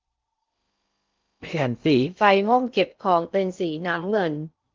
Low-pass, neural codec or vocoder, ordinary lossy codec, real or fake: 7.2 kHz; codec, 16 kHz in and 24 kHz out, 0.6 kbps, FocalCodec, streaming, 4096 codes; Opus, 24 kbps; fake